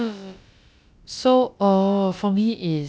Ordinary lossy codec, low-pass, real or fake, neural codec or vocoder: none; none; fake; codec, 16 kHz, about 1 kbps, DyCAST, with the encoder's durations